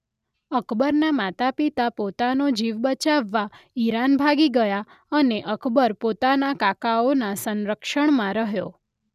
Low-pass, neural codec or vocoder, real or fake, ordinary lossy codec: 14.4 kHz; none; real; none